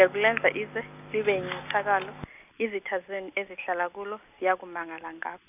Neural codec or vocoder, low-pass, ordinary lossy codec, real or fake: none; 3.6 kHz; none; real